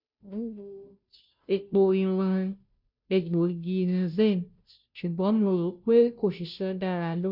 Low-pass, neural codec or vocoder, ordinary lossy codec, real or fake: 5.4 kHz; codec, 16 kHz, 0.5 kbps, FunCodec, trained on Chinese and English, 25 frames a second; none; fake